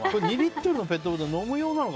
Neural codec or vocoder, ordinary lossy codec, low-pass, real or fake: none; none; none; real